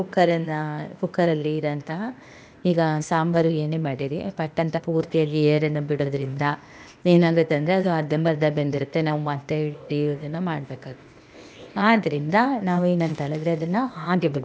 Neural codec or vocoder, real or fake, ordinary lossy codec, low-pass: codec, 16 kHz, 0.8 kbps, ZipCodec; fake; none; none